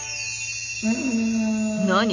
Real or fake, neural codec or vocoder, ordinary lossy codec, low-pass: real; none; none; 7.2 kHz